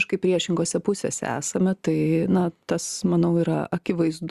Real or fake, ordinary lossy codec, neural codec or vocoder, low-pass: real; Opus, 64 kbps; none; 14.4 kHz